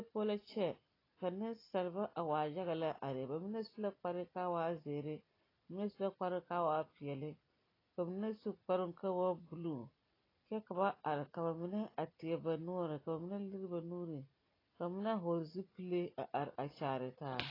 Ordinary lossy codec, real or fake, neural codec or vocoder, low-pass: AAC, 24 kbps; real; none; 5.4 kHz